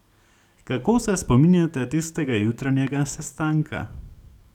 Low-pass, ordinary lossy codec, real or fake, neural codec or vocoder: 19.8 kHz; none; fake; codec, 44.1 kHz, 7.8 kbps, DAC